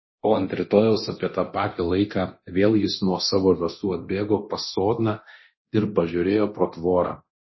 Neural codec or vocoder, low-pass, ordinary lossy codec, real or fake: codec, 24 kHz, 0.9 kbps, DualCodec; 7.2 kHz; MP3, 24 kbps; fake